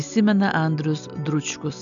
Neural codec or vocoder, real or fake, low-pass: none; real; 7.2 kHz